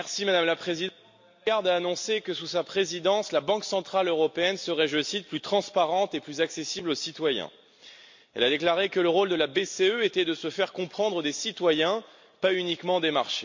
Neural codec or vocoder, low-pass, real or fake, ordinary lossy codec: none; 7.2 kHz; real; MP3, 48 kbps